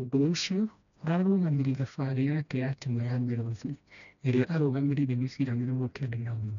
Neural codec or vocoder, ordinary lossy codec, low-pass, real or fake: codec, 16 kHz, 1 kbps, FreqCodec, smaller model; none; 7.2 kHz; fake